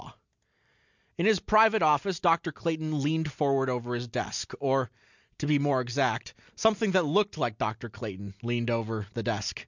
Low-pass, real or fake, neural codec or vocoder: 7.2 kHz; real; none